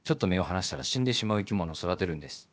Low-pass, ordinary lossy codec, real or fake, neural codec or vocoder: none; none; fake; codec, 16 kHz, about 1 kbps, DyCAST, with the encoder's durations